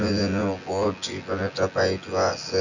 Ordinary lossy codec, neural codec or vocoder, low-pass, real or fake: none; vocoder, 24 kHz, 100 mel bands, Vocos; 7.2 kHz; fake